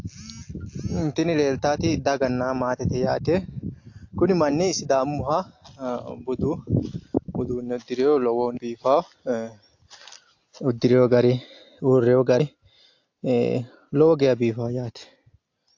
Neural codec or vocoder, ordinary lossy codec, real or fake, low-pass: none; AAC, 48 kbps; real; 7.2 kHz